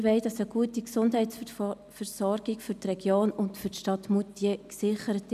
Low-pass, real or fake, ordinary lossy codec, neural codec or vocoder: 14.4 kHz; real; none; none